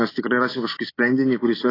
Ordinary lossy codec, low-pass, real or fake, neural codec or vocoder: AAC, 24 kbps; 5.4 kHz; real; none